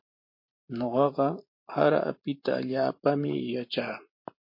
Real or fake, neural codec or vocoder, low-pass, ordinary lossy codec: fake; vocoder, 44.1 kHz, 128 mel bands every 512 samples, BigVGAN v2; 5.4 kHz; MP3, 32 kbps